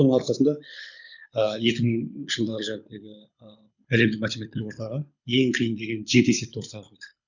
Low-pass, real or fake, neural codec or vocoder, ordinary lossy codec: 7.2 kHz; fake; codec, 24 kHz, 6 kbps, HILCodec; none